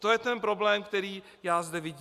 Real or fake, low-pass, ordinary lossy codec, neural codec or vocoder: real; 14.4 kHz; Opus, 64 kbps; none